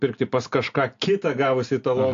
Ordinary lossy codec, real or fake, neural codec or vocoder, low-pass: AAC, 48 kbps; real; none; 7.2 kHz